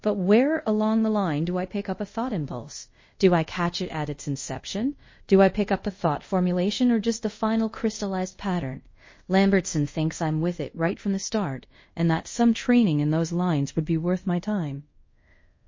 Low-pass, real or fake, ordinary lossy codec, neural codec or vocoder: 7.2 kHz; fake; MP3, 32 kbps; codec, 24 kHz, 0.5 kbps, DualCodec